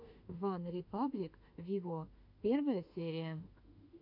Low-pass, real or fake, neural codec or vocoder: 5.4 kHz; fake; autoencoder, 48 kHz, 32 numbers a frame, DAC-VAE, trained on Japanese speech